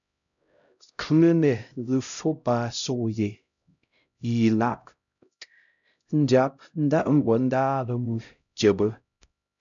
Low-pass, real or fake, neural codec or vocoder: 7.2 kHz; fake; codec, 16 kHz, 0.5 kbps, X-Codec, HuBERT features, trained on LibriSpeech